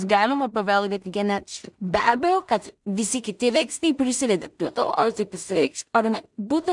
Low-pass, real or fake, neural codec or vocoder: 10.8 kHz; fake; codec, 16 kHz in and 24 kHz out, 0.4 kbps, LongCat-Audio-Codec, two codebook decoder